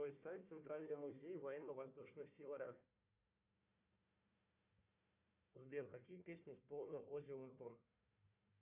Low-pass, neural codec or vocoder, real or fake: 3.6 kHz; codec, 16 kHz, 1 kbps, FunCodec, trained on Chinese and English, 50 frames a second; fake